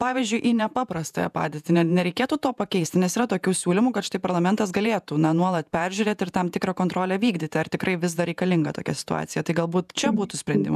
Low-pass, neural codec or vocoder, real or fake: 14.4 kHz; vocoder, 48 kHz, 128 mel bands, Vocos; fake